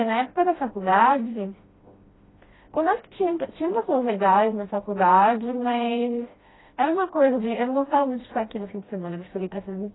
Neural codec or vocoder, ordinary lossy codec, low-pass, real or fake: codec, 16 kHz, 1 kbps, FreqCodec, smaller model; AAC, 16 kbps; 7.2 kHz; fake